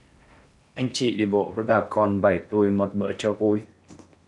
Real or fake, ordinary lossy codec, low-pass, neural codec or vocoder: fake; MP3, 96 kbps; 10.8 kHz; codec, 16 kHz in and 24 kHz out, 0.8 kbps, FocalCodec, streaming, 65536 codes